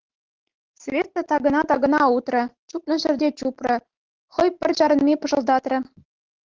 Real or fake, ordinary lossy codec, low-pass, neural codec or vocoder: real; Opus, 16 kbps; 7.2 kHz; none